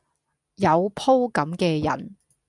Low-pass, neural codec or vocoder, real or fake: 10.8 kHz; none; real